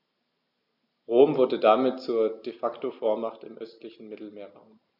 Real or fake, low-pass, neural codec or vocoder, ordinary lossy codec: real; 5.4 kHz; none; none